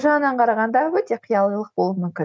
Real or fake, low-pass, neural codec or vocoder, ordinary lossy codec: real; none; none; none